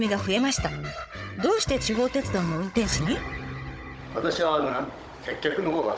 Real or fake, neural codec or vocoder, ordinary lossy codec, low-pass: fake; codec, 16 kHz, 16 kbps, FunCodec, trained on Chinese and English, 50 frames a second; none; none